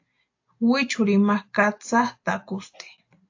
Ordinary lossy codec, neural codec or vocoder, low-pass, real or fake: AAC, 48 kbps; none; 7.2 kHz; real